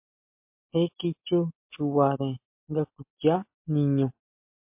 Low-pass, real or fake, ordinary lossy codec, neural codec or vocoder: 3.6 kHz; real; MP3, 32 kbps; none